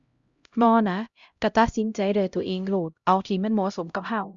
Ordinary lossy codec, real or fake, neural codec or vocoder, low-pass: Opus, 64 kbps; fake; codec, 16 kHz, 0.5 kbps, X-Codec, HuBERT features, trained on LibriSpeech; 7.2 kHz